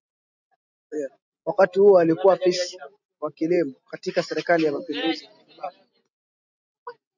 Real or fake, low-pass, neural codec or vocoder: real; 7.2 kHz; none